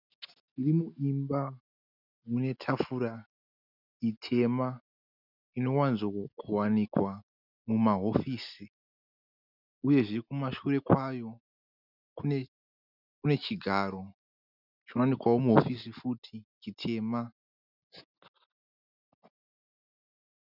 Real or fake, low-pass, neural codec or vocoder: real; 5.4 kHz; none